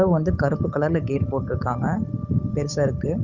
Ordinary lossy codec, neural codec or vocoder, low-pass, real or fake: none; codec, 16 kHz, 8 kbps, FunCodec, trained on Chinese and English, 25 frames a second; 7.2 kHz; fake